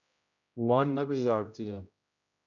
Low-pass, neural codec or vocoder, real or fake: 7.2 kHz; codec, 16 kHz, 0.5 kbps, X-Codec, HuBERT features, trained on general audio; fake